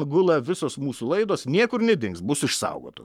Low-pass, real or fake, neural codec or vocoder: 19.8 kHz; fake; codec, 44.1 kHz, 7.8 kbps, Pupu-Codec